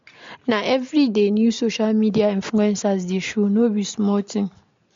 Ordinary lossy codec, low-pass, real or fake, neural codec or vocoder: MP3, 48 kbps; 7.2 kHz; real; none